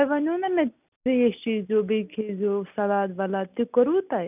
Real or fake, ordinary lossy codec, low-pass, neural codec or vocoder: real; none; 3.6 kHz; none